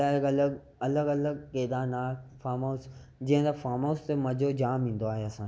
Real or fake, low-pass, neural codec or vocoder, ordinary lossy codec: real; none; none; none